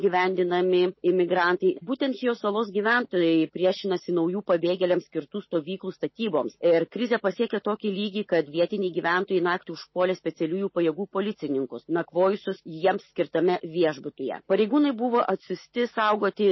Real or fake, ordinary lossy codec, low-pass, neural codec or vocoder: real; MP3, 24 kbps; 7.2 kHz; none